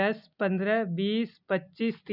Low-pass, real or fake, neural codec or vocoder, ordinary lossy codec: 5.4 kHz; real; none; none